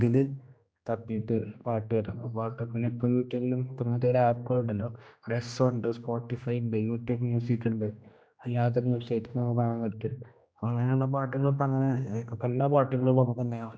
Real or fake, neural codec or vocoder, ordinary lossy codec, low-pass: fake; codec, 16 kHz, 1 kbps, X-Codec, HuBERT features, trained on general audio; none; none